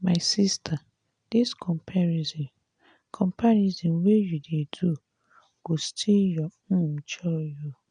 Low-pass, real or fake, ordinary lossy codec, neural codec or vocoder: 9.9 kHz; real; none; none